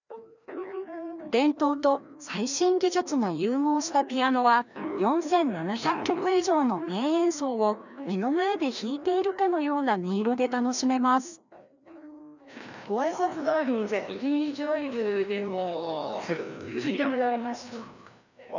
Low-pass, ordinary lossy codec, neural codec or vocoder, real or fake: 7.2 kHz; none; codec, 16 kHz, 1 kbps, FreqCodec, larger model; fake